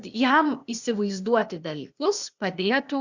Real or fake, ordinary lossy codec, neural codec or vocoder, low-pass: fake; Opus, 64 kbps; codec, 16 kHz, 0.8 kbps, ZipCodec; 7.2 kHz